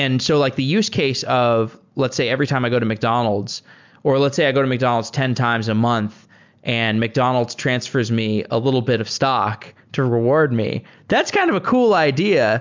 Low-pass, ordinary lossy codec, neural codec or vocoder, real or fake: 7.2 kHz; MP3, 64 kbps; none; real